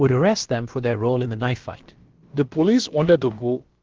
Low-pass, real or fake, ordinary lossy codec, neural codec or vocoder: 7.2 kHz; fake; Opus, 16 kbps; codec, 16 kHz, about 1 kbps, DyCAST, with the encoder's durations